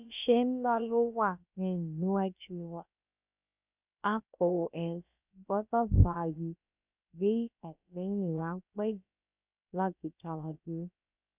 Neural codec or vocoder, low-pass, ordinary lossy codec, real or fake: codec, 16 kHz, about 1 kbps, DyCAST, with the encoder's durations; 3.6 kHz; none; fake